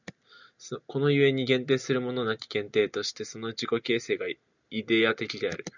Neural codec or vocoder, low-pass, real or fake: none; 7.2 kHz; real